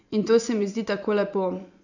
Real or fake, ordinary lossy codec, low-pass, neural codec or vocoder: fake; none; 7.2 kHz; vocoder, 24 kHz, 100 mel bands, Vocos